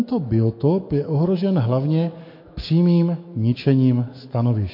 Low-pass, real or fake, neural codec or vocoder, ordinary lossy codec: 5.4 kHz; fake; autoencoder, 48 kHz, 128 numbers a frame, DAC-VAE, trained on Japanese speech; MP3, 32 kbps